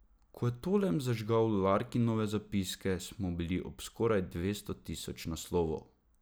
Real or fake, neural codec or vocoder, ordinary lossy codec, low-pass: real; none; none; none